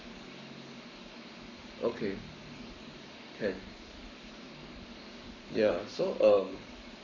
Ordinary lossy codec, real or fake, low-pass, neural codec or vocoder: none; fake; 7.2 kHz; codec, 44.1 kHz, 7.8 kbps, Pupu-Codec